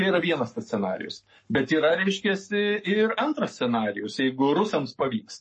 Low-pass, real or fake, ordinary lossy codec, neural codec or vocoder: 10.8 kHz; fake; MP3, 32 kbps; codec, 44.1 kHz, 7.8 kbps, Pupu-Codec